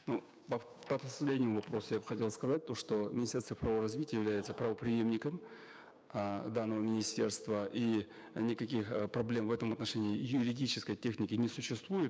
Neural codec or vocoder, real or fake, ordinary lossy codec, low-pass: codec, 16 kHz, 6 kbps, DAC; fake; none; none